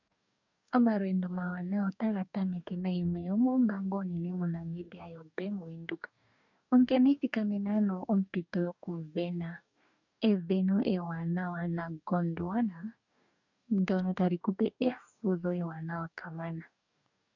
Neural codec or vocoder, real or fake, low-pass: codec, 44.1 kHz, 2.6 kbps, DAC; fake; 7.2 kHz